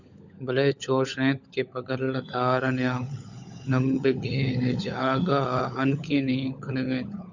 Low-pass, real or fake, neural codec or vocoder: 7.2 kHz; fake; codec, 16 kHz, 16 kbps, FunCodec, trained on LibriTTS, 50 frames a second